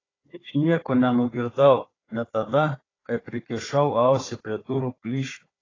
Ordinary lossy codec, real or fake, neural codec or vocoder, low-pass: AAC, 32 kbps; fake; codec, 16 kHz, 4 kbps, FunCodec, trained on Chinese and English, 50 frames a second; 7.2 kHz